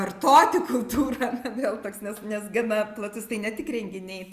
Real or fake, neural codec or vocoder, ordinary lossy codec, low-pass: real; none; Opus, 64 kbps; 14.4 kHz